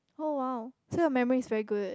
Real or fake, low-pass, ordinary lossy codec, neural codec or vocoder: real; none; none; none